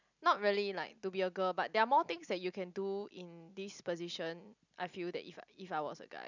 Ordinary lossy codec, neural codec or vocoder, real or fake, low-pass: none; none; real; 7.2 kHz